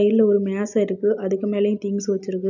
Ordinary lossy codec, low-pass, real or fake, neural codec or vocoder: none; 7.2 kHz; real; none